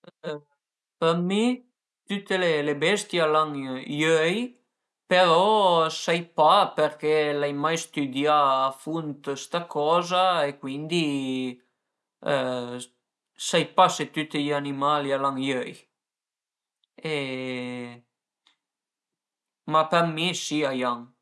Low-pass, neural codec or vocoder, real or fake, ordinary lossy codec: none; none; real; none